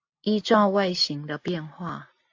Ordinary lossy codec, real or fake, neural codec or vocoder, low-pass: AAC, 48 kbps; real; none; 7.2 kHz